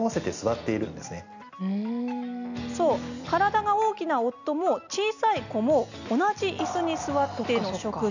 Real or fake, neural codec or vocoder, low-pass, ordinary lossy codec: real; none; 7.2 kHz; none